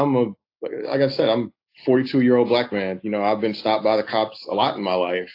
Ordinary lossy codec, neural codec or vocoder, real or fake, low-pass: AAC, 32 kbps; none; real; 5.4 kHz